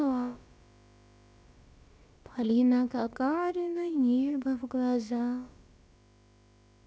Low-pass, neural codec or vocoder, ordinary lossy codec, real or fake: none; codec, 16 kHz, about 1 kbps, DyCAST, with the encoder's durations; none; fake